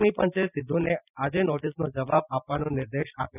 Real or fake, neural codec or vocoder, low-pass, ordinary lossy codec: real; none; 3.6 kHz; none